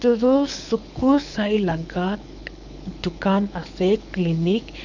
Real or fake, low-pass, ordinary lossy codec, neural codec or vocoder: fake; 7.2 kHz; none; codec, 24 kHz, 6 kbps, HILCodec